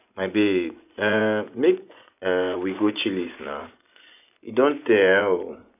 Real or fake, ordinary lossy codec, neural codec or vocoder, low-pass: fake; none; vocoder, 24 kHz, 100 mel bands, Vocos; 3.6 kHz